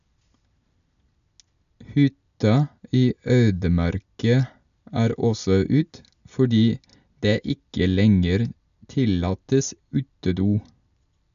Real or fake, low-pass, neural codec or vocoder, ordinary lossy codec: real; 7.2 kHz; none; none